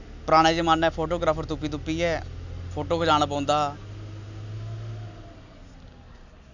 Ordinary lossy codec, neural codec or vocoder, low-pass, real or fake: none; none; 7.2 kHz; real